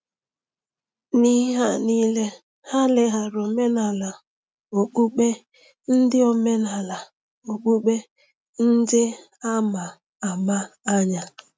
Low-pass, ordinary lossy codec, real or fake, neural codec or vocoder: none; none; real; none